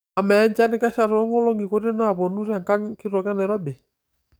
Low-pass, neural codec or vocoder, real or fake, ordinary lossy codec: none; codec, 44.1 kHz, 7.8 kbps, DAC; fake; none